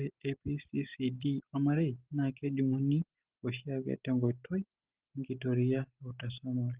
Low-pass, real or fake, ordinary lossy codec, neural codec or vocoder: 3.6 kHz; real; Opus, 16 kbps; none